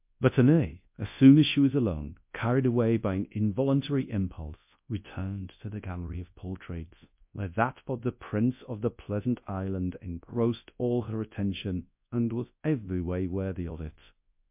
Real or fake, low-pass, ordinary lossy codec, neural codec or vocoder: fake; 3.6 kHz; MP3, 32 kbps; codec, 24 kHz, 0.9 kbps, WavTokenizer, large speech release